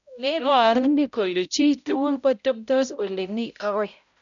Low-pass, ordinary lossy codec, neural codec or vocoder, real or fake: 7.2 kHz; none; codec, 16 kHz, 0.5 kbps, X-Codec, HuBERT features, trained on balanced general audio; fake